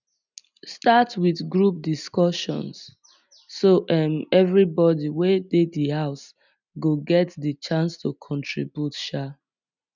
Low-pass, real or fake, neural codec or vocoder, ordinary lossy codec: 7.2 kHz; real; none; none